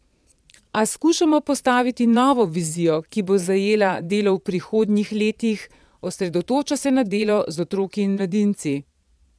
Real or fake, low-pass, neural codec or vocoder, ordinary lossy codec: fake; none; vocoder, 22.05 kHz, 80 mel bands, Vocos; none